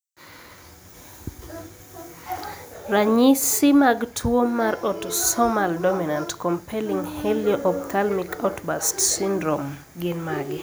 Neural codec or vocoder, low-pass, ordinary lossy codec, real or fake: none; none; none; real